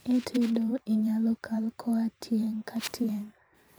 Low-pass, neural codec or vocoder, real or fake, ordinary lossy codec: none; vocoder, 44.1 kHz, 128 mel bands every 512 samples, BigVGAN v2; fake; none